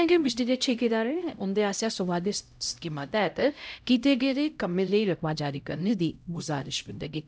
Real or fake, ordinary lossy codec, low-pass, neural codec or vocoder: fake; none; none; codec, 16 kHz, 0.5 kbps, X-Codec, HuBERT features, trained on LibriSpeech